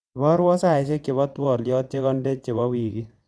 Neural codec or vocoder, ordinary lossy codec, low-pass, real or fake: vocoder, 22.05 kHz, 80 mel bands, WaveNeXt; none; none; fake